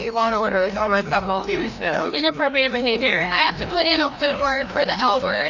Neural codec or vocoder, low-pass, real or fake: codec, 16 kHz, 1 kbps, FreqCodec, larger model; 7.2 kHz; fake